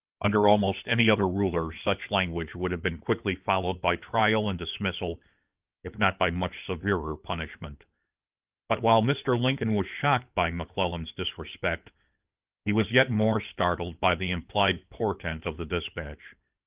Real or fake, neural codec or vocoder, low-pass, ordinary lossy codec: fake; codec, 16 kHz in and 24 kHz out, 2.2 kbps, FireRedTTS-2 codec; 3.6 kHz; Opus, 24 kbps